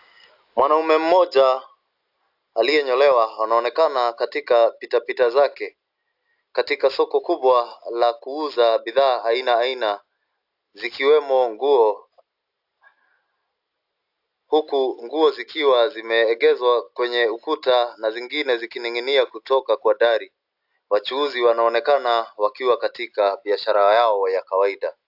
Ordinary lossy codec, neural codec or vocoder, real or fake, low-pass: AAC, 48 kbps; none; real; 5.4 kHz